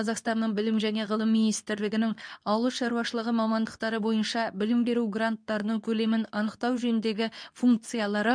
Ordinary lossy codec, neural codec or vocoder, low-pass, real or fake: none; codec, 24 kHz, 0.9 kbps, WavTokenizer, medium speech release version 2; 9.9 kHz; fake